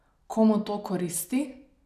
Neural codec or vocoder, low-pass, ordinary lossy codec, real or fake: none; 14.4 kHz; none; real